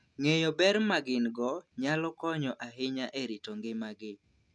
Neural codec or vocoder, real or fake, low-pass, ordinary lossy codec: none; real; none; none